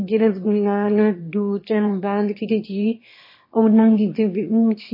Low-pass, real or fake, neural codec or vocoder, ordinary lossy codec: 5.4 kHz; fake; autoencoder, 22.05 kHz, a latent of 192 numbers a frame, VITS, trained on one speaker; MP3, 24 kbps